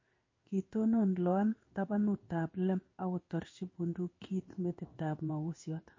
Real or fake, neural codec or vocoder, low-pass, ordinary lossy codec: fake; codec, 16 kHz in and 24 kHz out, 1 kbps, XY-Tokenizer; 7.2 kHz; MP3, 32 kbps